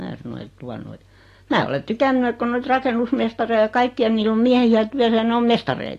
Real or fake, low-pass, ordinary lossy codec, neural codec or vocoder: real; 14.4 kHz; AAC, 48 kbps; none